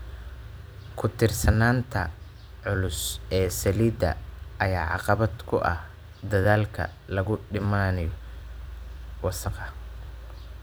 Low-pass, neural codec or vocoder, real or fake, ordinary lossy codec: none; vocoder, 44.1 kHz, 128 mel bands every 256 samples, BigVGAN v2; fake; none